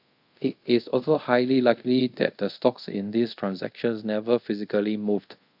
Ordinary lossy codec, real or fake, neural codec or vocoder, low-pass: AAC, 48 kbps; fake; codec, 24 kHz, 0.5 kbps, DualCodec; 5.4 kHz